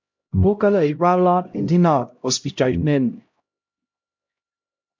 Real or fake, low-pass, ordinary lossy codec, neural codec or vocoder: fake; 7.2 kHz; MP3, 48 kbps; codec, 16 kHz, 0.5 kbps, X-Codec, HuBERT features, trained on LibriSpeech